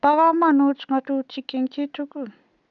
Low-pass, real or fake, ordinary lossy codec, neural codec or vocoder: 7.2 kHz; real; none; none